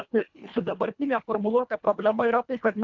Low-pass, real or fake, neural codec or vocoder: 7.2 kHz; fake; codec, 24 kHz, 1.5 kbps, HILCodec